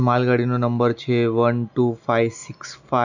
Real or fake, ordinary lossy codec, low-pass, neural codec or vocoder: real; none; 7.2 kHz; none